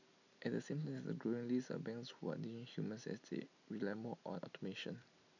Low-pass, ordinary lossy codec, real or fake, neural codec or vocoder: 7.2 kHz; none; real; none